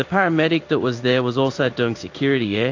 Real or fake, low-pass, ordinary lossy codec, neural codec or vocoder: fake; 7.2 kHz; AAC, 48 kbps; codec, 16 kHz in and 24 kHz out, 1 kbps, XY-Tokenizer